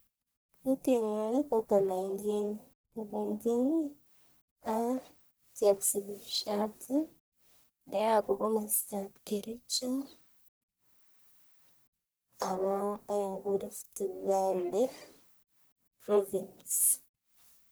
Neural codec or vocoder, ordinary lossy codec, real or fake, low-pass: codec, 44.1 kHz, 1.7 kbps, Pupu-Codec; none; fake; none